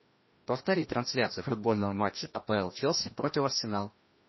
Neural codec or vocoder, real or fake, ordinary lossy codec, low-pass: codec, 16 kHz, 1 kbps, FreqCodec, larger model; fake; MP3, 24 kbps; 7.2 kHz